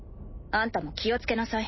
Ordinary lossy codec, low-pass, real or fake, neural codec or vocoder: MP3, 24 kbps; 7.2 kHz; real; none